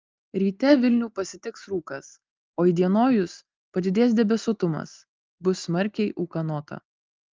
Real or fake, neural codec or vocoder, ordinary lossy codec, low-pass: real; none; Opus, 32 kbps; 7.2 kHz